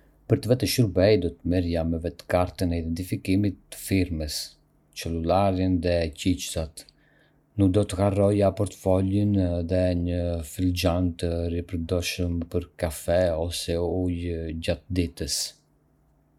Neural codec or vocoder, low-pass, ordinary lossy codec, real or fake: none; 19.8 kHz; none; real